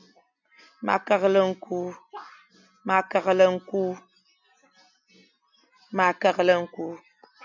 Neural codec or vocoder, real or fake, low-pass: none; real; 7.2 kHz